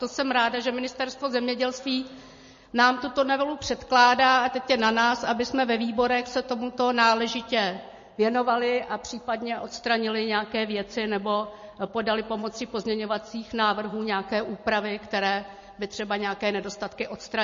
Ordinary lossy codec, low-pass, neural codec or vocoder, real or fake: MP3, 32 kbps; 7.2 kHz; none; real